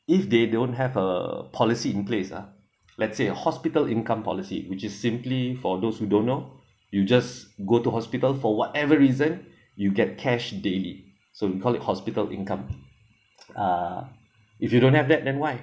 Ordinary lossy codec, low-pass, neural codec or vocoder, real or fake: none; none; none; real